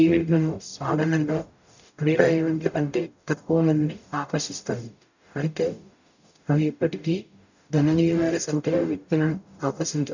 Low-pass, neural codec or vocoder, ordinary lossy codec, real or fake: 7.2 kHz; codec, 44.1 kHz, 0.9 kbps, DAC; none; fake